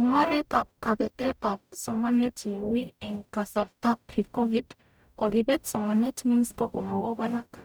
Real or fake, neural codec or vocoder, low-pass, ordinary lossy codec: fake; codec, 44.1 kHz, 0.9 kbps, DAC; none; none